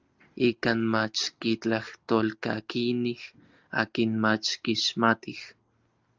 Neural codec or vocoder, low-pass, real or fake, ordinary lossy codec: none; 7.2 kHz; real; Opus, 24 kbps